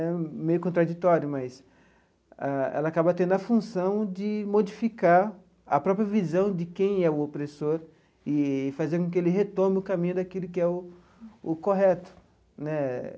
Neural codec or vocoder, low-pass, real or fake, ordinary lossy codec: none; none; real; none